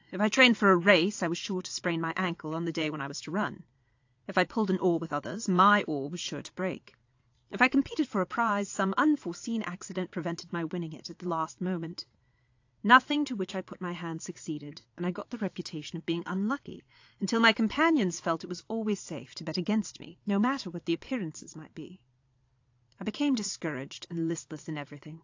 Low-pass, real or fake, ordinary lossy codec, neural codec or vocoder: 7.2 kHz; fake; AAC, 48 kbps; vocoder, 22.05 kHz, 80 mel bands, Vocos